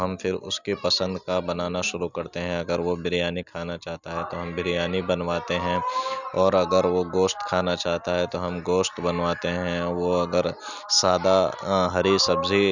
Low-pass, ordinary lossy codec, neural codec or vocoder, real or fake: 7.2 kHz; none; none; real